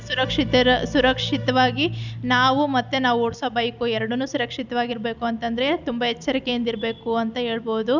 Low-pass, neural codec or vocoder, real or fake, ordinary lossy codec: 7.2 kHz; none; real; none